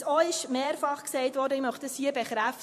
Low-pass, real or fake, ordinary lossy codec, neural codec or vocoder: 14.4 kHz; fake; MP3, 64 kbps; vocoder, 44.1 kHz, 128 mel bands every 512 samples, BigVGAN v2